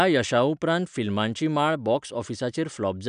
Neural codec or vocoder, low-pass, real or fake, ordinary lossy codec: none; 9.9 kHz; real; none